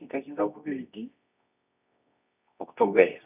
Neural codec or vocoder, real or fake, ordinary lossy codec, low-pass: codec, 24 kHz, 0.9 kbps, WavTokenizer, medium music audio release; fake; none; 3.6 kHz